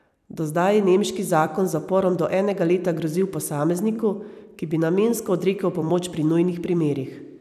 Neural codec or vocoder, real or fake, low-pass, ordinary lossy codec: vocoder, 44.1 kHz, 128 mel bands every 256 samples, BigVGAN v2; fake; 14.4 kHz; none